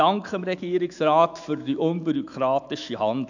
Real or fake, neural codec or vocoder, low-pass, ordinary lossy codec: fake; autoencoder, 48 kHz, 128 numbers a frame, DAC-VAE, trained on Japanese speech; 7.2 kHz; none